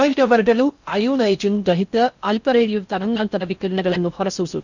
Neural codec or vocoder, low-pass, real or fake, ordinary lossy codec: codec, 16 kHz in and 24 kHz out, 0.6 kbps, FocalCodec, streaming, 4096 codes; 7.2 kHz; fake; none